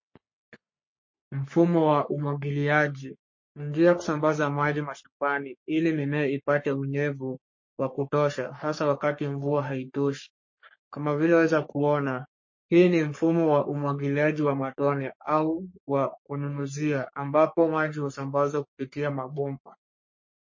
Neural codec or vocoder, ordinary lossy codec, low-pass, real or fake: codec, 44.1 kHz, 3.4 kbps, Pupu-Codec; MP3, 32 kbps; 7.2 kHz; fake